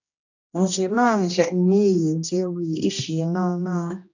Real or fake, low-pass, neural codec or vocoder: fake; 7.2 kHz; codec, 16 kHz, 1 kbps, X-Codec, HuBERT features, trained on general audio